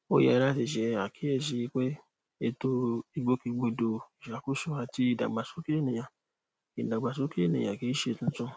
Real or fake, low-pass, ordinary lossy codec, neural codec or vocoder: real; none; none; none